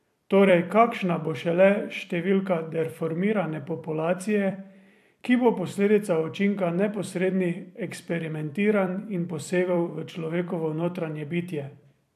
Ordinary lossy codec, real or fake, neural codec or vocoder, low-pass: AAC, 96 kbps; fake; vocoder, 44.1 kHz, 128 mel bands every 256 samples, BigVGAN v2; 14.4 kHz